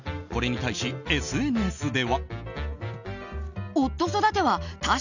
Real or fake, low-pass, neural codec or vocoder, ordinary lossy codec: real; 7.2 kHz; none; none